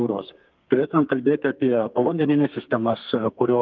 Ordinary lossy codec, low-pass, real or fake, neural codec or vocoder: Opus, 32 kbps; 7.2 kHz; fake; codec, 32 kHz, 1.9 kbps, SNAC